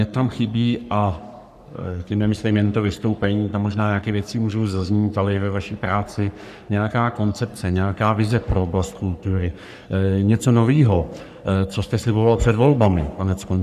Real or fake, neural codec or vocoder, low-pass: fake; codec, 44.1 kHz, 3.4 kbps, Pupu-Codec; 14.4 kHz